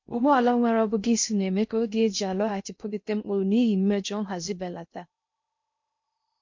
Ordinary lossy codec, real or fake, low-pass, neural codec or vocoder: MP3, 48 kbps; fake; 7.2 kHz; codec, 16 kHz in and 24 kHz out, 0.6 kbps, FocalCodec, streaming, 2048 codes